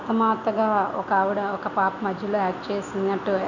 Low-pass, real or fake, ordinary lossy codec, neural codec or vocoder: 7.2 kHz; real; none; none